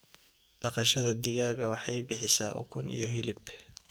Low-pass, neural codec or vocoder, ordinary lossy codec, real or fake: none; codec, 44.1 kHz, 2.6 kbps, SNAC; none; fake